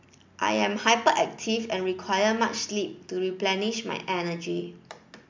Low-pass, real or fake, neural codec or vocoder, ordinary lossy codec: 7.2 kHz; real; none; MP3, 64 kbps